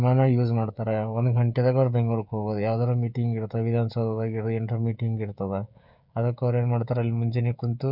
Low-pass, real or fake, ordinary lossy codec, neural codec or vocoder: 5.4 kHz; fake; none; codec, 16 kHz, 16 kbps, FreqCodec, smaller model